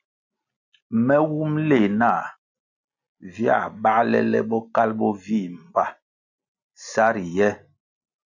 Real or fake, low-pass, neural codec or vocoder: real; 7.2 kHz; none